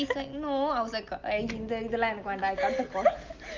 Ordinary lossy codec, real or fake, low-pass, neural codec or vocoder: Opus, 24 kbps; real; 7.2 kHz; none